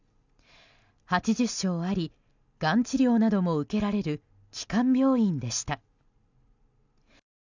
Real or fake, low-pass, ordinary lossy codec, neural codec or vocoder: real; 7.2 kHz; none; none